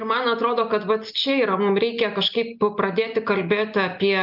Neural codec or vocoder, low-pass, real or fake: none; 5.4 kHz; real